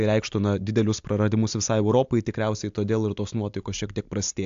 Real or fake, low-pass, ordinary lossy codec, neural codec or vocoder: real; 7.2 kHz; AAC, 64 kbps; none